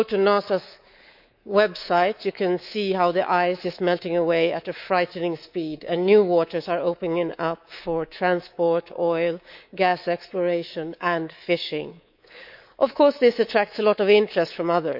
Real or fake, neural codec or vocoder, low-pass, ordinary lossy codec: fake; codec, 24 kHz, 3.1 kbps, DualCodec; 5.4 kHz; none